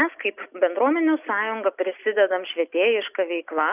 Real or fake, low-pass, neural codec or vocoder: real; 3.6 kHz; none